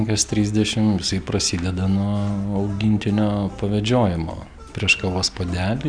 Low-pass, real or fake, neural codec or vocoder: 9.9 kHz; real; none